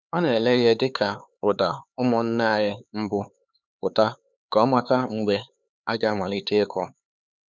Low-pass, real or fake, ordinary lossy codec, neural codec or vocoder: none; fake; none; codec, 16 kHz, 4 kbps, X-Codec, HuBERT features, trained on LibriSpeech